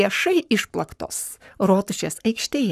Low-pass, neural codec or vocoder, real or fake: 14.4 kHz; codec, 44.1 kHz, 7.8 kbps, Pupu-Codec; fake